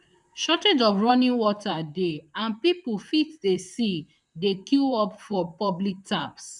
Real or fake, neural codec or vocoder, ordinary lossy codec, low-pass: fake; vocoder, 44.1 kHz, 128 mel bands, Pupu-Vocoder; none; 10.8 kHz